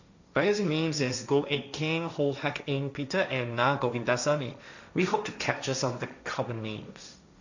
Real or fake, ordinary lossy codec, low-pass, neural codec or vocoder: fake; none; 7.2 kHz; codec, 16 kHz, 1.1 kbps, Voila-Tokenizer